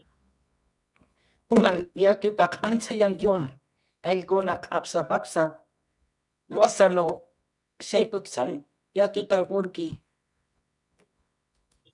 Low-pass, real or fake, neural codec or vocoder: 10.8 kHz; fake; codec, 24 kHz, 0.9 kbps, WavTokenizer, medium music audio release